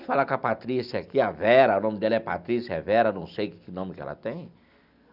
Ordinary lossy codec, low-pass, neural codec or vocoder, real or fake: none; 5.4 kHz; none; real